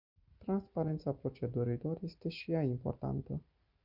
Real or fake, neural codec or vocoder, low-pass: real; none; 5.4 kHz